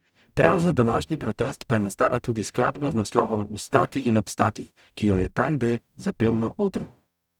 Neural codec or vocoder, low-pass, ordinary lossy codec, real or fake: codec, 44.1 kHz, 0.9 kbps, DAC; 19.8 kHz; none; fake